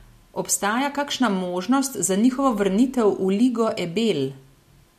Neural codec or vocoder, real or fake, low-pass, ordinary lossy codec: none; real; 14.4 kHz; MP3, 64 kbps